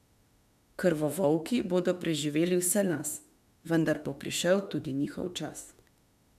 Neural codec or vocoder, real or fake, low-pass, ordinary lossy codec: autoencoder, 48 kHz, 32 numbers a frame, DAC-VAE, trained on Japanese speech; fake; 14.4 kHz; none